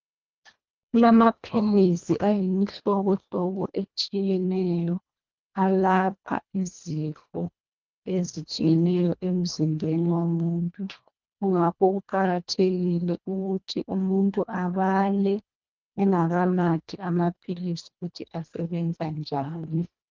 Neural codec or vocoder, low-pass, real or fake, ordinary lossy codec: codec, 24 kHz, 1.5 kbps, HILCodec; 7.2 kHz; fake; Opus, 24 kbps